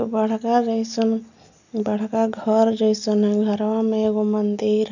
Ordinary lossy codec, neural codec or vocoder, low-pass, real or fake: none; none; 7.2 kHz; real